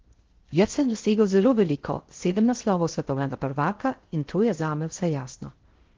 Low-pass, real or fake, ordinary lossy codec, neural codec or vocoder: 7.2 kHz; fake; Opus, 16 kbps; codec, 16 kHz in and 24 kHz out, 0.6 kbps, FocalCodec, streaming, 4096 codes